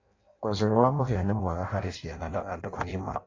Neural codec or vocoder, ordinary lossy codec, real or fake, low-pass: codec, 16 kHz in and 24 kHz out, 0.6 kbps, FireRedTTS-2 codec; AAC, 32 kbps; fake; 7.2 kHz